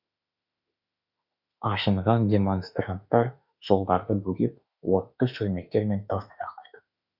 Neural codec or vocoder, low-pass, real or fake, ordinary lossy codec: autoencoder, 48 kHz, 32 numbers a frame, DAC-VAE, trained on Japanese speech; 5.4 kHz; fake; none